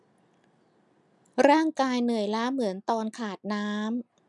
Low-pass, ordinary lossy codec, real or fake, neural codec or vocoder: 10.8 kHz; none; real; none